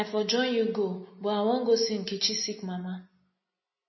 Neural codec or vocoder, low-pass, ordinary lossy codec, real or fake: none; 7.2 kHz; MP3, 24 kbps; real